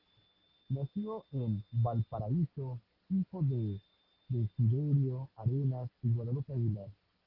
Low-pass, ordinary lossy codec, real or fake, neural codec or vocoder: 5.4 kHz; Opus, 16 kbps; real; none